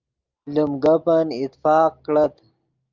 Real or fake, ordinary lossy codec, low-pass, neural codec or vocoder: real; Opus, 32 kbps; 7.2 kHz; none